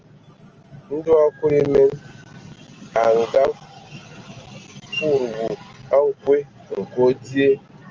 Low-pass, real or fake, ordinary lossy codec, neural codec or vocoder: 7.2 kHz; real; Opus, 24 kbps; none